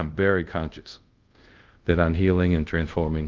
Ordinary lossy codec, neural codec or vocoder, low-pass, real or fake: Opus, 32 kbps; codec, 24 kHz, 0.5 kbps, DualCodec; 7.2 kHz; fake